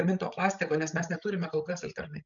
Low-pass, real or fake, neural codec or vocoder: 7.2 kHz; fake; codec, 16 kHz, 16 kbps, FreqCodec, larger model